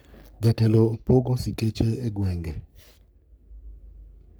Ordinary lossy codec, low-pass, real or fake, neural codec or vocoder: none; none; fake; codec, 44.1 kHz, 3.4 kbps, Pupu-Codec